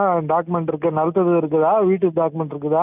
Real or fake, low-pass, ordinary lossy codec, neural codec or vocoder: real; 3.6 kHz; none; none